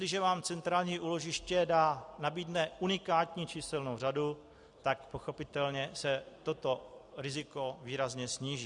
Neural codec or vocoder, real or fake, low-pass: none; real; 10.8 kHz